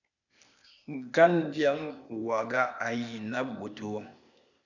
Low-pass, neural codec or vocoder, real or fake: 7.2 kHz; codec, 16 kHz, 0.8 kbps, ZipCodec; fake